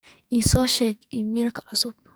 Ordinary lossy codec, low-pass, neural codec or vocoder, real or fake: none; none; codec, 44.1 kHz, 2.6 kbps, SNAC; fake